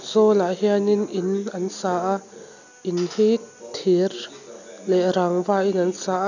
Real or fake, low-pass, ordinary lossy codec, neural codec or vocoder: real; 7.2 kHz; none; none